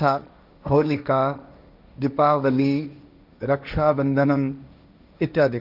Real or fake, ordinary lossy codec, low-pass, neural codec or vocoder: fake; none; 5.4 kHz; codec, 16 kHz, 1.1 kbps, Voila-Tokenizer